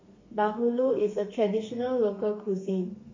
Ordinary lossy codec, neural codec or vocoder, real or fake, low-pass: MP3, 32 kbps; codec, 32 kHz, 1.9 kbps, SNAC; fake; 7.2 kHz